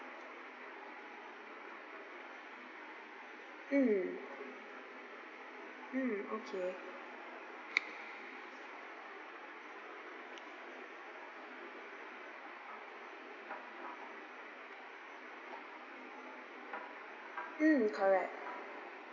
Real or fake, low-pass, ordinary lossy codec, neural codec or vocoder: real; 7.2 kHz; none; none